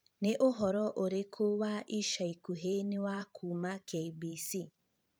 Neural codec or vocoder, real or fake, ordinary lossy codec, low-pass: vocoder, 44.1 kHz, 128 mel bands every 512 samples, BigVGAN v2; fake; none; none